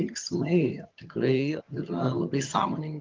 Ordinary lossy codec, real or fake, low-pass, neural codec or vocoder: Opus, 32 kbps; fake; 7.2 kHz; vocoder, 22.05 kHz, 80 mel bands, HiFi-GAN